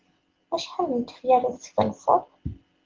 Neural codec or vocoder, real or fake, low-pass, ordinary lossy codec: none; real; 7.2 kHz; Opus, 16 kbps